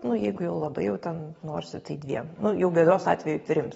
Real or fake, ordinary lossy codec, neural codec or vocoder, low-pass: fake; AAC, 24 kbps; vocoder, 44.1 kHz, 128 mel bands every 512 samples, BigVGAN v2; 19.8 kHz